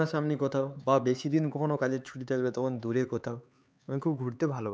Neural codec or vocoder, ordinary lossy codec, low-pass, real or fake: codec, 16 kHz, 4 kbps, X-Codec, HuBERT features, trained on LibriSpeech; none; none; fake